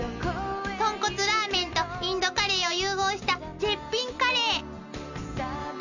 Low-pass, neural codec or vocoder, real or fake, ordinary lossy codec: 7.2 kHz; none; real; none